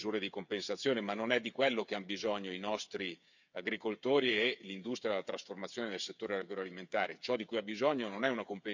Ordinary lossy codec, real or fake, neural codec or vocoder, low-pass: none; fake; codec, 16 kHz, 8 kbps, FreqCodec, smaller model; 7.2 kHz